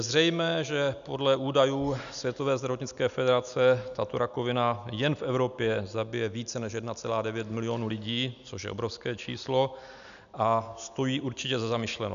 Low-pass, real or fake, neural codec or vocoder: 7.2 kHz; real; none